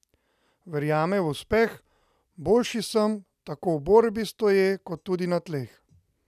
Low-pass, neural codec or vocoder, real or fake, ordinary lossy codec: 14.4 kHz; none; real; none